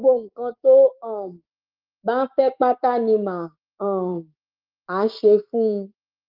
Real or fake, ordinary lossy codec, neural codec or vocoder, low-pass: fake; Opus, 32 kbps; codec, 16 kHz, 6 kbps, DAC; 5.4 kHz